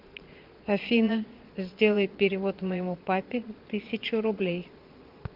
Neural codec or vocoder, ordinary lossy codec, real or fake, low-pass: vocoder, 22.05 kHz, 80 mel bands, Vocos; Opus, 32 kbps; fake; 5.4 kHz